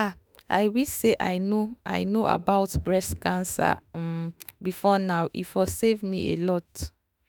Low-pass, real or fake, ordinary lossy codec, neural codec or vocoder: none; fake; none; autoencoder, 48 kHz, 32 numbers a frame, DAC-VAE, trained on Japanese speech